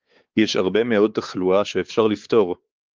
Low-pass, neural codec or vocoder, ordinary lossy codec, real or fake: 7.2 kHz; codec, 16 kHz, 4 kbps, X-Codec, WavLM features, trained on Multilingual LibriSpeech; Opus, 32 kbps; fake